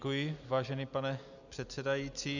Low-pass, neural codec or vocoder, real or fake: 7.2 kHz; none; real